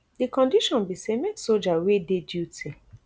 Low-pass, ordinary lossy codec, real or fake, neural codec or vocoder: none; none; real; none